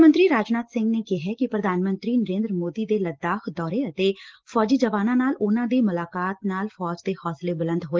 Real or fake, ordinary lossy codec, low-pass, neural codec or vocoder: real; Opus, 16 kbps; 7.2 kHz; none